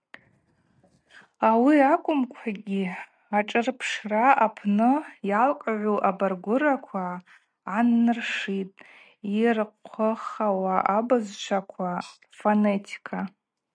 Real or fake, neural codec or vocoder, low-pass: real; none; 9.9 kHz